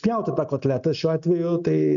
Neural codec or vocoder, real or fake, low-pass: none; real; 7.2 kHz